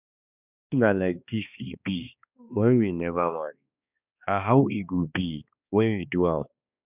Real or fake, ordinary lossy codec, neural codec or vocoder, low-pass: fake; none; codec, 16 kHz, 2 kbps, X-Codec, HuBERT features, trained on balanced general audio; 3.6 kHz